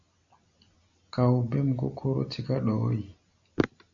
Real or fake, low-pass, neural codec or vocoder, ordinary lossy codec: real; 7.2 kHz; none; AAC, 64 kbps